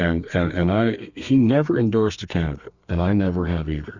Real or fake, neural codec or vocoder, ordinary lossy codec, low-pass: fake; codec, 32 kHz, 1.9 kbps, SNAC; Opus, 64 kbps; 7.2 kHz